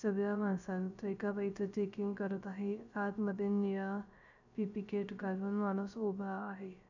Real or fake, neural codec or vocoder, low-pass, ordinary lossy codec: fake; codec, 16 kHz, 0.3 kbps, FocalCodec; 7.2 kHz; none